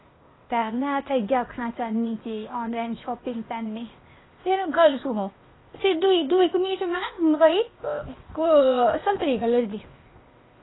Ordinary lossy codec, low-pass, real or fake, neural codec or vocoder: AAC, 16 kbps; 7.2 kHz; fake; codec, 16 kHz, 0.8 kbps, ZipCodec